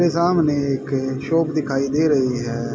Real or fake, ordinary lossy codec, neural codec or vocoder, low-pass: real; none; none; none